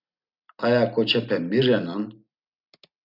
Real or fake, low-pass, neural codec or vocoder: real; 5.4 kHz; none